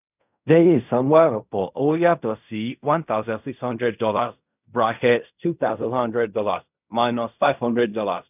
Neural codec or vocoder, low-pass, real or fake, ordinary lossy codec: codec, 16 kHz in and 24 kHz out, 0.4 kbps, LongCat-Audio-Codec, fine tuned four codebook decoder; 3.6 kHz; fake; none